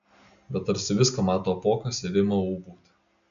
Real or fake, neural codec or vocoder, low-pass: real; none; 7.2 kHz